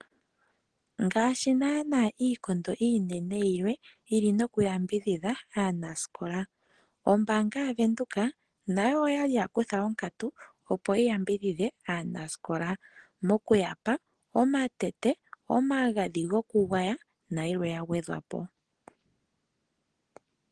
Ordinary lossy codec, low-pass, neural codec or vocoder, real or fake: Opus, 16 kbps; 9.9 kHz; none; real